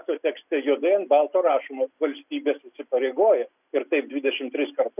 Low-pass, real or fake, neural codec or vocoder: 3.6 kHz; real; none